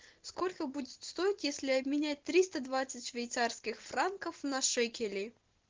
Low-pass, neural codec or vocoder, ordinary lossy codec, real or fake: 7.2 kHz; none; Opus, 16 kbps; real